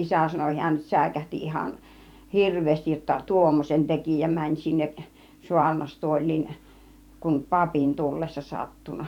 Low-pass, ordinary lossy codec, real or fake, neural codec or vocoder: 19.8 kHz; none; fake; vocoder, 44.1 kHz, 128 mel bands every 512 samples, BigVGAN v2